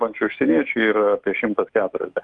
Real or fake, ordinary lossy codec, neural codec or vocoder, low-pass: fake; Opus, 32 kbps; autoencoder, 48 kHz, 128 numbers a frame, DAC-VAE, trained on Japanese speech; 10.8 kHz